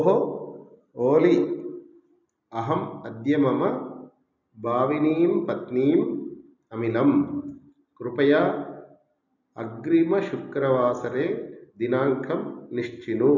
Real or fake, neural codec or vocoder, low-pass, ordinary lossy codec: real; none; 7.2 kHz; none